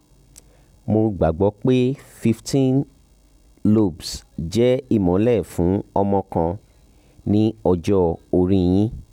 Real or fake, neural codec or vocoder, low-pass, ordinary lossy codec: real; none; 19.8 kHz; none